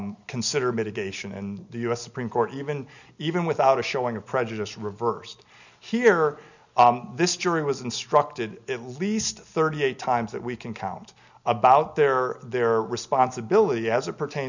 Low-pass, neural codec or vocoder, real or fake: 7.2 kHz; none; real